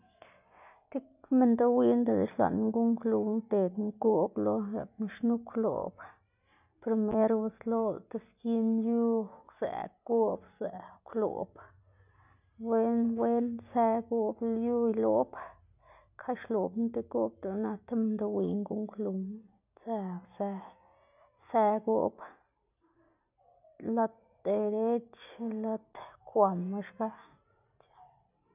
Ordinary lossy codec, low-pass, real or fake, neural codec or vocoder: none; 3.6 kHz; real; none